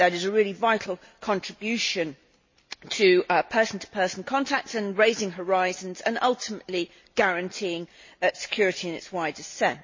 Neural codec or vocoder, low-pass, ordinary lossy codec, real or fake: none; 7.2 kHz; MP3, 32 kbps; real